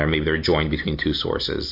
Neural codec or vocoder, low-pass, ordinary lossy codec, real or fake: none; 5.4 kHz; MP3, 32 kbps; real